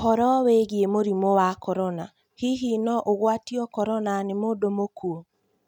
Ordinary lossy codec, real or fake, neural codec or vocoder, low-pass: none; real; none; 19.8 kHz